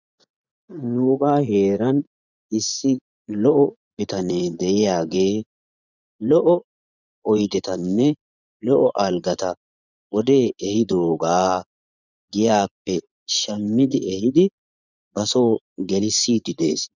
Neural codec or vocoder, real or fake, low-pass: vocoder, 24 kHz, 100 mel bands, Vocos; fake; 7.2 kHz